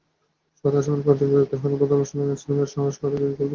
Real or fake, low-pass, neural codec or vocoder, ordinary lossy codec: fake; 7.2 kHz; codec, 24 kHz, 0.9 kbps, WavTokenizer, medium speech release version 2; Opus, 16 kbps